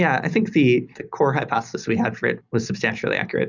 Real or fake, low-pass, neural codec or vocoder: real; 7.2 kHz; none